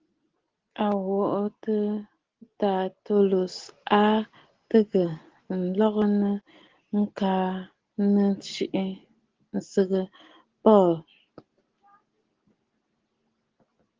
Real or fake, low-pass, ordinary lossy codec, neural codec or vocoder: real; 7.2 kHz; Opus, 16 kbps; none